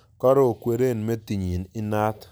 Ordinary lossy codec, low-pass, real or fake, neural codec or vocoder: none; none; real; none